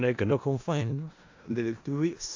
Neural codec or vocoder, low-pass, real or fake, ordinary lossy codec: codec, 16 kHz in and 24 kHz out, 0.4 kbps, LongCat-Audio-Codec, four codebook decoder; 7.2 kHz; fake; AAC, 48 kbps